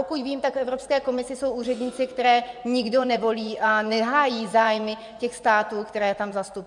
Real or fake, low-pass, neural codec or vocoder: fake; 10.8 kHz; vocoder, 24 kHz, 100 mel bands, Vocos